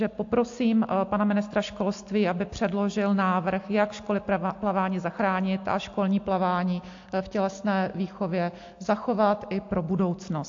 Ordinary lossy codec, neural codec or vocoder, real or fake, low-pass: AAC, 48 kbps; none; real; 7.2 kHz